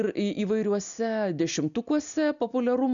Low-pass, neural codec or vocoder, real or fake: 7.2 kHz; none; real